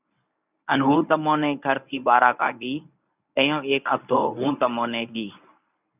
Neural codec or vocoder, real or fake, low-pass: codec, 24 kHz, 0.9 kbps, WavTokenizer, medium speech release version 1; fake; 3.6 kHz